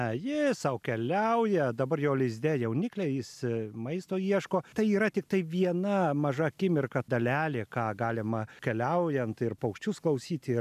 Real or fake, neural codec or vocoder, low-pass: real; none; 14.4 kHz